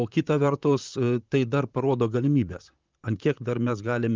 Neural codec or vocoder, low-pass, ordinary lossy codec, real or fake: vocoder, 44.1 kHz, 128 mel bands, Pupu-Vocoder; 7.2 kHz; Opus, 32 kbps; fake